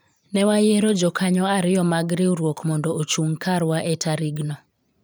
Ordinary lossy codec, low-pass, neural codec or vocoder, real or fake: none; none; vocoder, 44.1 kHz, 128 mel bands every 512 samples, BigVGAN v2; fake